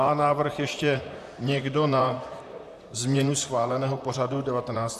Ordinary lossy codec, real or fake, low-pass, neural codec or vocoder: AAC, 96 kbps; fake; 14.4 kHz; vocoder, 44.1 kHz, 128 mel bands, Pupu-Vocoder